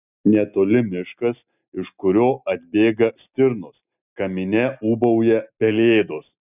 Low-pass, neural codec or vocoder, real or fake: 3.6 kHz; none; real